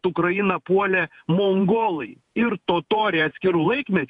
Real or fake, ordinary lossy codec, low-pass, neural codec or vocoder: fake; AAC, 64 kbps; 10.8 kHz; vocoder, 48 kHz, 128 mel bands, Vocos